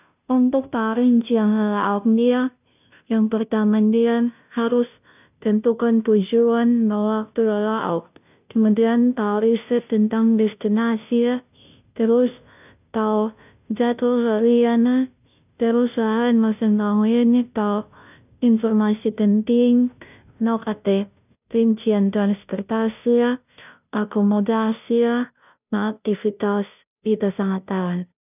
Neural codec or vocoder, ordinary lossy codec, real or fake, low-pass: codec, 16 kHz, 0.5 kbps, FunCodec, trained on Chinese and English, 25 frames a second; none; fake; 3.6 kHz